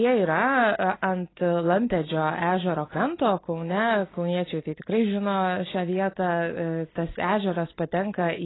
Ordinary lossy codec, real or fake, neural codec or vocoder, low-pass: AAC, 16 kbps; real; none; 7.2 kHz